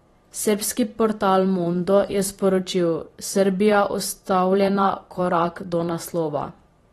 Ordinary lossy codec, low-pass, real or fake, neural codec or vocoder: AAC, 32 kbps; 19.8 kHz; fake; vocoder, 44.1 kHz, 128 mel bands every 512 samples, BigVGAN v2